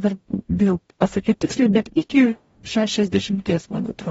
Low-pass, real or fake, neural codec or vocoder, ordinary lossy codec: 19.8 kHz; fake; codec, 44.1 kHz, 0.9 kbps, DAC; AAC, 24 kbps